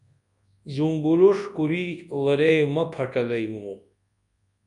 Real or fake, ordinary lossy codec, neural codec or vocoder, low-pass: fake; MP3, 64 kbps; codec, 24 kHz, 0.9 kbps, WavTokenizer, large speech release; 10.8 kHz